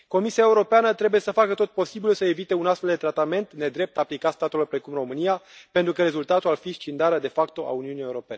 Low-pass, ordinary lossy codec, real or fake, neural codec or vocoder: none; none; real; none